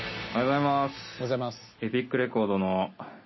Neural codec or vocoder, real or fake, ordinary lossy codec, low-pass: none; real; MP3, 24 kbps; 7.2 kHz